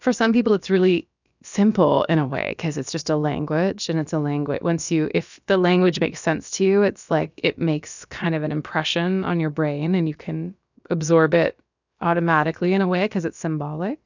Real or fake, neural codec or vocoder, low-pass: fake; codec, 16 kHz, about 1 kbps, DyCAST, with the encoder's durations; 7.2 kHz